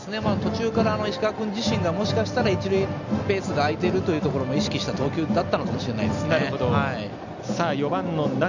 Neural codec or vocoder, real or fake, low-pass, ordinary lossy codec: none; real; 7.2 kHz; none